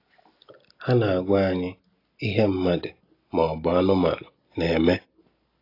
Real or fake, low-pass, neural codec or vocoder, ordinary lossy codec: real; 5.4 kHz; none; AAC, 32 kbps